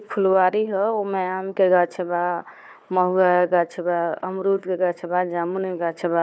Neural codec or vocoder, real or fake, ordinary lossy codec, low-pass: codec, 16 kHz, 4 kbps, FunCodec, trained on Chinese and English, 50 frames a second; fake; none; none